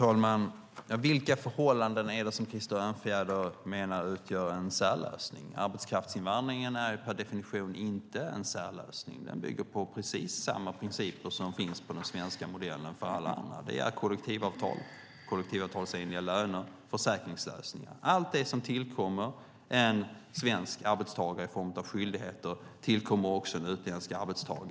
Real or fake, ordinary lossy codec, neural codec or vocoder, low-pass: real; none; none; none